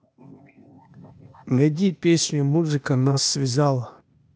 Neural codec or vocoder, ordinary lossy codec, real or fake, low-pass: codec, 16 kHz, 0.8 kbps, ZipCodec; none; fake; none